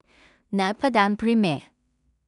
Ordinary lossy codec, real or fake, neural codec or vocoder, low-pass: none; fake; codec, 16 kHz in and 24 kHz out, 0.4 kbps, LongCat-Audio-Codec, two codebook decoder; 10.8 kHz